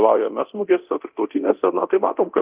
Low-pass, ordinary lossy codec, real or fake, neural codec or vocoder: 3.6 kHz; Opus, 32 kbps; fake; codec, 24 kHz, 0.9 kbps, DualCodec